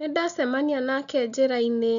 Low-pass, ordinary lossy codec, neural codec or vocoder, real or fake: 7.2 kHz; none; none; real